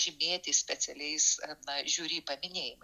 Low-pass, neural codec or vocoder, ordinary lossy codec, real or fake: 14.4 kHz; none; Opus, 64 kbps; real